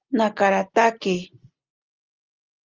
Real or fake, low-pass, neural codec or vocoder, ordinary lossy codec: real; 7.2 kHz; none; Opus, 32 kbps